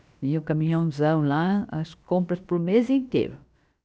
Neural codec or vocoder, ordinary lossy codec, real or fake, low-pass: codec, 16 kHz, about 1 kbps, DyCAST, with the encoder's durations; none; fake; none